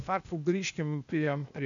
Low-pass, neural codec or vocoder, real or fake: 7.2 kHz; codec, 16 kHz, 0.8 kbps, ZipCodec; fake